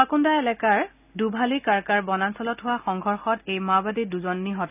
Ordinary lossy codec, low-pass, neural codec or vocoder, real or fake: none; 3.6 kHz; none; real